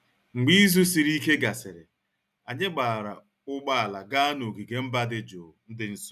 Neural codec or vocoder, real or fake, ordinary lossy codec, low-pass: none; real; none; 14.4 kHz